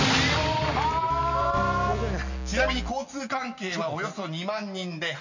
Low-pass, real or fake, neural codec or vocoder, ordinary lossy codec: 7.2 kHz; real; none; none